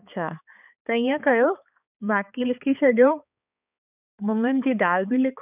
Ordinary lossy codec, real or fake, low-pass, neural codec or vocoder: none; fake; 3.6 kHz; codec, 16 kHz, 4 kbps, X-Codec, HuBERT features, trained on balanced general audio